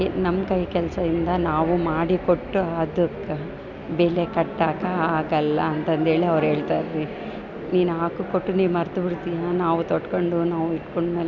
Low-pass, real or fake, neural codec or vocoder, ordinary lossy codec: 7.2 kHz; real; none; none